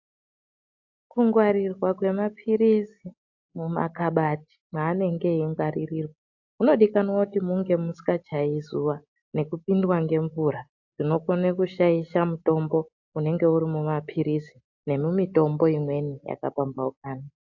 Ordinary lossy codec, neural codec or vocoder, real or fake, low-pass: AAC, 48 kbps; none; real; 7.2 kHz